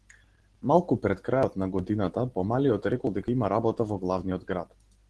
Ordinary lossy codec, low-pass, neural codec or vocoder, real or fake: Opus, 16 kbps; 10.8 kHz; none; real